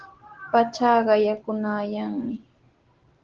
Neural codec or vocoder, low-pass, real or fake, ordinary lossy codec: none; 7.2 kHz; real; Opus, 16 kbps